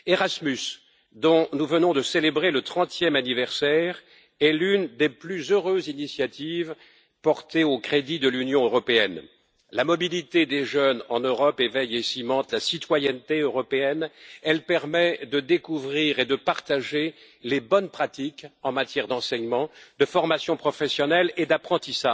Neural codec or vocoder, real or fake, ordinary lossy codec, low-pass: none; real; none; none